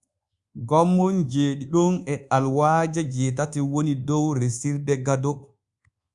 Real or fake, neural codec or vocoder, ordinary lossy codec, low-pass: fake; codec, 24 kHz, 1.2 kbps, DualCodec; Opus, 64 kbps; 10.8 kHz